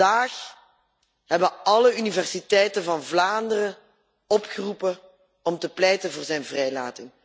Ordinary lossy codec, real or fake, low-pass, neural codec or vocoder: none; real; none; none